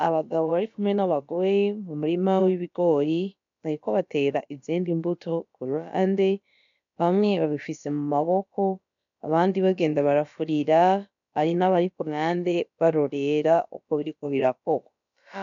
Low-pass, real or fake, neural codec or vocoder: 7.2 kHz; fake; codec, 16 kHz, about 1 kbps, DyCAST, with the encoder's durations